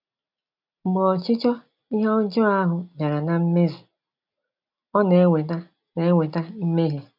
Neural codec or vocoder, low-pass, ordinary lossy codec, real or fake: none; 5.4 kHz; none; real